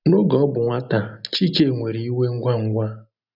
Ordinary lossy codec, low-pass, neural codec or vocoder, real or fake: none; 5.4 kHz; none; real